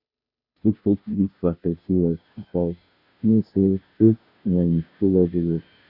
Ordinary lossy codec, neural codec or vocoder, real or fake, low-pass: none; codec, 16 kHz, 0.5 kbps, FunCodec, trained on Chinese and English, 25 frames a second; fake; 5.4 kHz